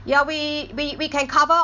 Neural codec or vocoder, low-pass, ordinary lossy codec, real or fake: none; 7.2 kHz; none; real